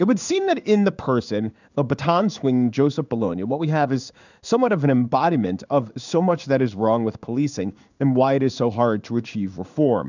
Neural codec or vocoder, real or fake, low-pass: codec, 16 kHz in and 24 kHz out, 1 kbps, XY-Tokenizer; fake; 7.2 kHz